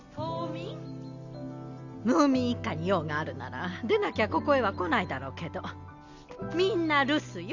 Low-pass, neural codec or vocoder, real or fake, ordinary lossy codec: 7.2 kHz; none; real; none